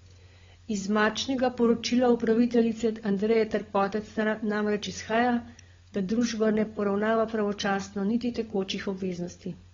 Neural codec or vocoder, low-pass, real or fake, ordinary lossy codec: codec, 16 kHz, 16 kbps, FunCodec, trained on LibriTTS, 50 frames a second; 7.2 kHz; fake; AAC, 24 kbps